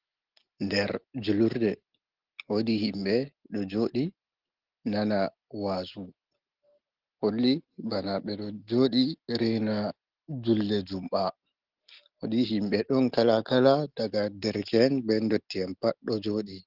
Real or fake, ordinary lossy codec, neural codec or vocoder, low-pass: real; Opus, 16 kbps; none; 5.4 kHz